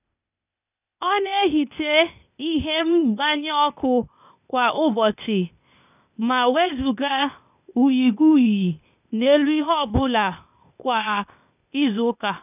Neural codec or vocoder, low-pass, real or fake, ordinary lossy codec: codec, 16 kHz, 0.8 kbps, ZipCodec; 3.6 kHz; fake; none